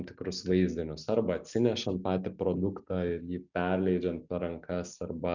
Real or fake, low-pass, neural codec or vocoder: real; 7.2 kHz; none